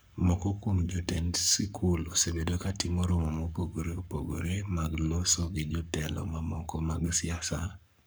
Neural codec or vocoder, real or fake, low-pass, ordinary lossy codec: codec, 44.1 kHz, 7.8 kbps, Pupu-Codec; fake; none; none